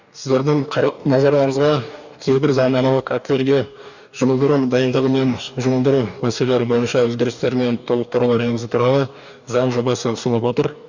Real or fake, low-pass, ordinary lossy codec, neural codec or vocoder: fake; 7.2 kHz; none; codec, 44.1 kHz, 2.6 kbps, DAC